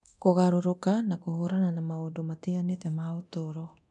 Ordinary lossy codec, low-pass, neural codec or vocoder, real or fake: none; 10.8 kHz; codec, 24 kHz, 0.9 kbps, DualCodec; fake